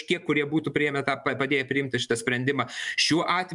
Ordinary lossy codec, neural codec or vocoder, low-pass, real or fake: MP3, 96 kbps; vocoder, 44.1 kHz, 128 mel bands every 512 samples, BigVGAN v2; 10.8 kHz; fake